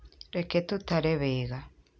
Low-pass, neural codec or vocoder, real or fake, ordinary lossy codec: none; none; real; none